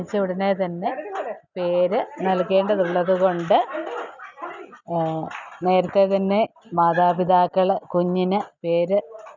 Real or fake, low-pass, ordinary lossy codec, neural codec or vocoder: real; 7.2 kHz; none; none